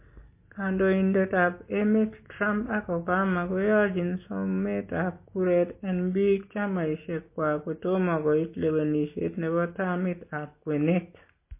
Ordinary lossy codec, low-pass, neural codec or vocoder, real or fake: MP3, 24 kbps; 3.6 kHz; none; real